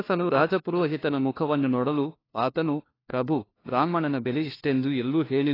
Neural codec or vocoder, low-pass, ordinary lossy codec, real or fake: codec, 16 kHz, 1 kbps, FunCodec, trained on LibriTTS, 50 frames a second; 5.4 kHz; AAC, 24 kbps; fake